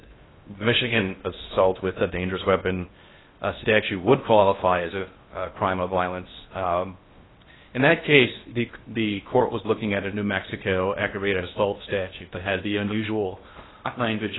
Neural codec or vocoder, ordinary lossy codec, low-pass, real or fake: codec, 16 kHz in and 24 kHz out, 0.6 kbps, FocalCodec, streaming, 4096 codes; AAC, 16 kbps; 7.2 kHz; fake